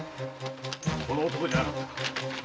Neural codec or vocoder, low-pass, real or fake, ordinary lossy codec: none; none; real; none